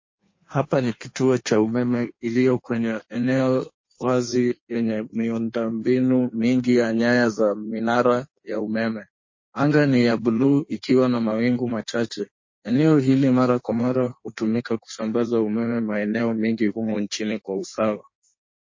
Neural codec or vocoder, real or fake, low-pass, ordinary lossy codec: codec, 16 kHz in and 24 kHz out, 1.1 kbps, FireRedTTS-2 codec; fake; 7.2 kHz; MP3, 32 kbps